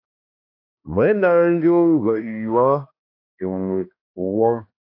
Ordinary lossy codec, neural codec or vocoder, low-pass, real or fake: AAC, 32 kbps; codec, 16 kHz, 1 kbps, X-Codec, HuBERT features, trained on balanced general audio; 5.4 kHz; fake